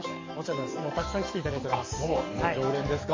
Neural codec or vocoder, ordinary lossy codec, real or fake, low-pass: codec, 44.1 kHz, 7.8 kbps, DAC; MP3, 32 kbps; fake; 7.2 kHz